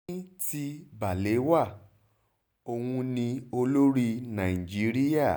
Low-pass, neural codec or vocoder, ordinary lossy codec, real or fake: none; none; none; real